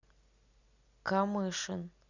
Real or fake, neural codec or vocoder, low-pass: real; none; 7.2 kHz